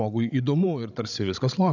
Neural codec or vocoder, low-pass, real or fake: codec, 16 kHz, 16 kbps, FreqCodec, larger model; 7.2 kHz; fake